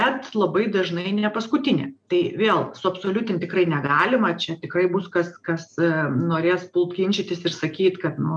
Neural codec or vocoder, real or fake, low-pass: none; real; 9.9 kHz